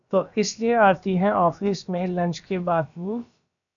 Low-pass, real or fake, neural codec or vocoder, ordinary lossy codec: 7.2 kHz; fake; codec, 16 kHz, about 1 kbps, DyCAST, with the encoder's durations; MP3, 96 kbps